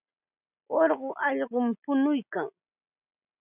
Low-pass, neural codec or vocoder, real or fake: 3.6 kHz; none; real